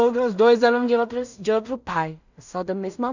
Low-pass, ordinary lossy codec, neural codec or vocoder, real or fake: 7.2 kHz; none; codec, 16 kHz in and 24 kHz out, 0.4 kbps, LongCat-Audio-Codec, two codebook decoder; fake